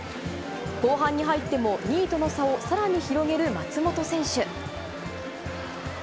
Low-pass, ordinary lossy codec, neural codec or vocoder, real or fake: none; none; none; real